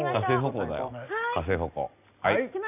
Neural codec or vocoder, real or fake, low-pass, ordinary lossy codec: none; real; 3.6 kHz; none